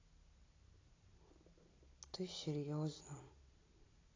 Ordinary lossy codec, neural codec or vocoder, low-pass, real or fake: AAC, 32 kbps; none; 7.2 kHz; real